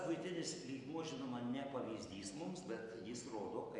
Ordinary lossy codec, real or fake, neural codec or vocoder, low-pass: MP3, 64 kbps; real; none; 10.8 kHz